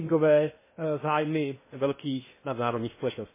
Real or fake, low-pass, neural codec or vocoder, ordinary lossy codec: fake; 3.6 kHz; codec, 16 kHz in and 24 kHz out, 0.8 kbps, FocalCodec, streaming, 65536 codes; MP3, 16 kbps